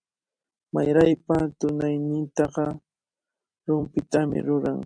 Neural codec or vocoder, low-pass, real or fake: vocoder, 44.1 kHz, 128 mel bands every 256 samples, BigVGAN v2; 9.9 kHz; fake